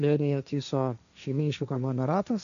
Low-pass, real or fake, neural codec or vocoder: 7.2 kHz; fake; codec, 16 kHz, 1.1 kbps, Voila-Tokenizer